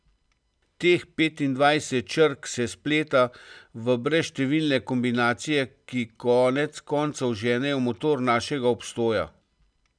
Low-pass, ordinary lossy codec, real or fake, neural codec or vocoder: 9.9 kHz; none; real; none